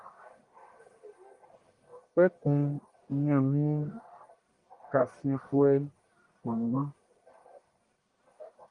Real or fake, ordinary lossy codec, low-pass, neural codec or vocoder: fake; Opus, 32 kbps; 10.8 kHz; codec, 44.1 kHz, 1.7 kbps, Pupu-Codec